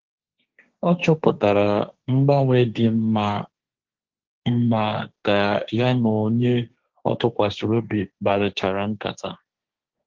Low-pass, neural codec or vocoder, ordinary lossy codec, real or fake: 7.2 kHz; codec, 16 kHz, 1.1 kbps, Voila-Tokenizer; Opus, 16 kbps; fake